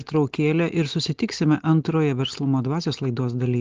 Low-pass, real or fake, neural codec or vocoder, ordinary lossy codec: 7.2 kHz; real; none; Opus, 16 kbps